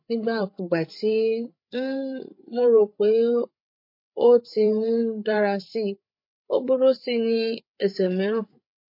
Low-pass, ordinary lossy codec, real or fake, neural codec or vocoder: 5.4 kHz; MP3, 32 kbps; fake; codec, 16 kHz, 8 kbps, FreqCodec, larger model